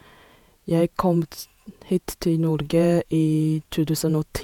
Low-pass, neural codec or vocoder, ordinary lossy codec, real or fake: 19.8 kHz; vocoder, 48 kHz, 128 mel bands, Vocos; none; fake